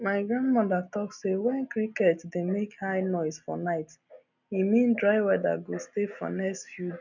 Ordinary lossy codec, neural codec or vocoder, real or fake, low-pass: none; none; real; 7.2 kHz